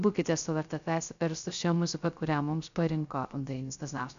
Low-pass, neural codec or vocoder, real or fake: 7.2 kHz; codec, 16 kHz, 0.3 kbps, FocalCodec; fake